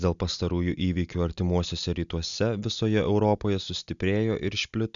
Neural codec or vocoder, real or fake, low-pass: none; real; 7.2 kHz